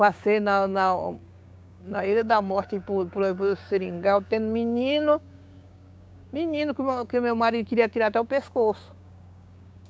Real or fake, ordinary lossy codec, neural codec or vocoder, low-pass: fake; none; codec, 16 kHz, 6 kbps, DAC; none